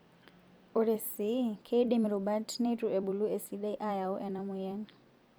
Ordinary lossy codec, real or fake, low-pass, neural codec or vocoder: none; fake; none; vocoder, 44.1 kHz, 128 mel bands every 256 samples, BigVGAN v2